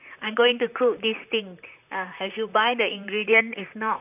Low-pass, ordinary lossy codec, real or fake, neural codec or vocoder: 3.6 kHz; none; fake; vocoder, 44.1 kHz, 128 mel bands, Pupu-Vocoder